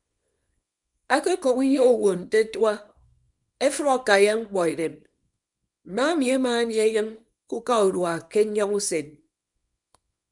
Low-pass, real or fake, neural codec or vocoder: 10.8 kHz; fake; codec, 24 kHz, 0.9 kbps, WavTokenizer, small release